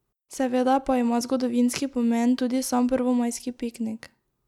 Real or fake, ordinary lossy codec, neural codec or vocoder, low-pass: real; none; none; 19.8 kHz